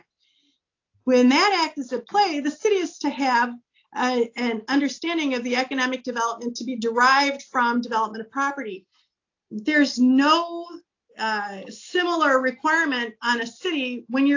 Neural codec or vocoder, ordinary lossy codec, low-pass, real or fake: none; AAC, 48 kbps; 7.2 kHz; real